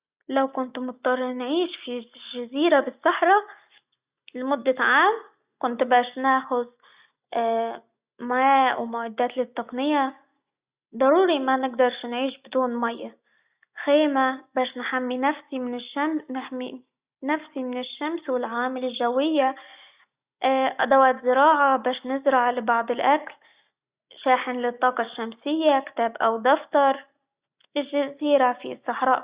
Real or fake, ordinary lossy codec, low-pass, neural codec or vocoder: real; Opus, 64 kbps; 3.6 kHz; none